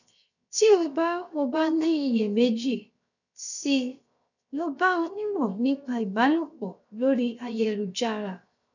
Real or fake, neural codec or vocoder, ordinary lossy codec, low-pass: fake; codec, 16 kHz, 0.7 kbps, FocalCodec; none; 7.2 kHz